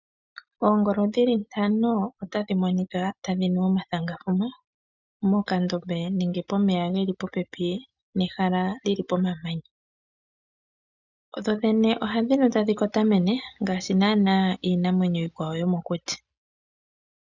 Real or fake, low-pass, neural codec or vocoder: real; 7.2 kHz; none